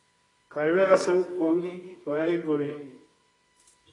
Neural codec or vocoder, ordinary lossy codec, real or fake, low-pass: codec, 24 kHz, 0.9 kbps, WavTokenizer, medium music audio release; AAC, 32 kbps; fake; 10.8 kHz